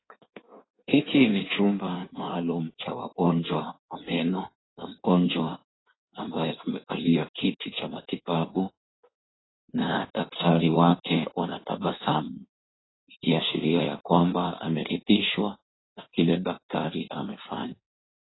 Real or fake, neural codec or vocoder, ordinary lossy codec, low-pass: fake; codec, 16 kHz in and 24 kHz out, 1.1 kbps, FireRedTTS-2 codec; AAC, 16 kbps; 7.2 kHz